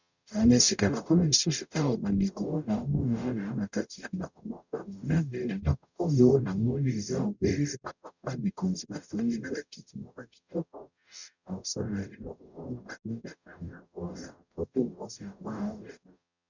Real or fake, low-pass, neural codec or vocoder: fake; 7.2 kHz; codec, 44.1 kHz, 0.9 kbps, DAC